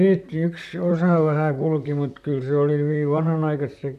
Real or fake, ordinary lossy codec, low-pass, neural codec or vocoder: fake; none; 14.4 kHz; vocoder, 44.1 kHz, 128 mel bands, Pupu-Vocoder